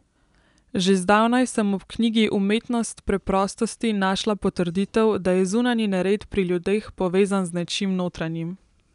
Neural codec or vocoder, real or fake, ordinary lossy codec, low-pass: none; real; none; 10.8 kHz